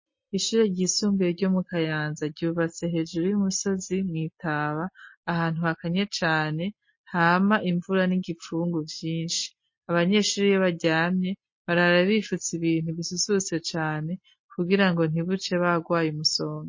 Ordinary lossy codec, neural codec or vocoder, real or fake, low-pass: MP3, 32 kbps; none; real; 7.2 kHz